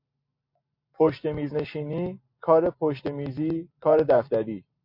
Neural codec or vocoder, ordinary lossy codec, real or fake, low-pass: none; MP3, 32 kbps; real; 5.4 kHz